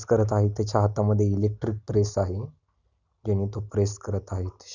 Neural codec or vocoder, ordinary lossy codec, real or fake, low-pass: none; none; real; 7.2 kHz